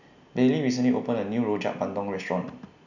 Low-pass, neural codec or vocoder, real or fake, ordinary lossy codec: 7.2 kHz; none; real; none